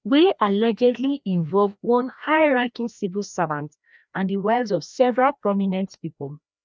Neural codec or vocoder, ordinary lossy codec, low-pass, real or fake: codec, 16 kHz, 1 kbps, FreqCodec, larger model; none; none; fake